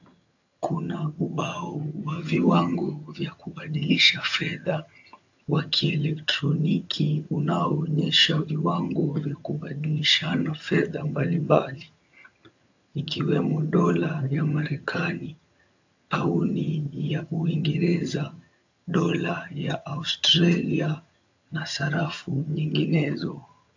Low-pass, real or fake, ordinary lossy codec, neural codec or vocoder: 7.2 kHz; fake; AAC, 48 kbps; vocoder, 22.05 kHz, 80 mel bands, HiFi-GAN